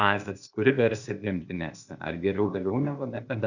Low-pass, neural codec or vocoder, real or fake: 7.2 kHz; codec, 16 kHz, 0.8 kbps, ZipCodec; fake